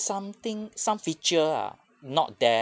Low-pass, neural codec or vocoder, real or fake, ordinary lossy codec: none; none; real; none